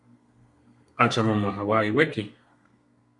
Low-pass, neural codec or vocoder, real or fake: 10.8 kHz; codec, 32 kHz, 1.9 kbps, SNAC; fake